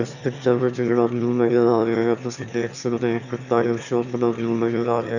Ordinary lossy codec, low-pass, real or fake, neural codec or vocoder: none; 7.2 kHz; fake; autoencoder, 22.05 kHz, a latent of 192 numbers a frame, VITS, trained on one speaker